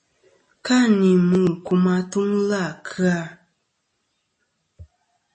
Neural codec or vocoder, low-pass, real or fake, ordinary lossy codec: none; 9.9 kHz; real; MP3, 32 kbps